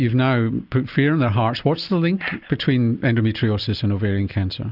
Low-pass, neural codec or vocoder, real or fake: 5.4 kHz; none; real